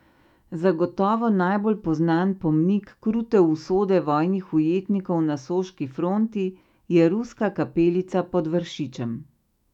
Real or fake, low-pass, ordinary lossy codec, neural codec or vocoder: fake; 19.8 kHz; none; autoencoder, 48 kHz, 128 numbers a frame, DAC-VAE, trained on Japanese speech